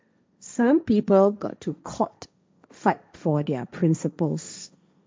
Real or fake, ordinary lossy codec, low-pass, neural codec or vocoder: fake; none; none; codec, 16 kHz, 1.1 kbps, Voila-Tokenizer